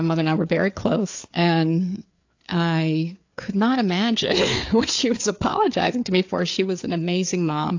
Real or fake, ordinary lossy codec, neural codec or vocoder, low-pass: fake; AAC, 48 kbps; codec, 16 kHz, 4 kbps, FreqCodec, larger model; 7.2 kHz